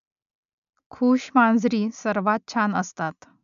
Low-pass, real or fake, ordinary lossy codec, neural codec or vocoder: 7.2 kHz; real; AAC, 96 kbps; none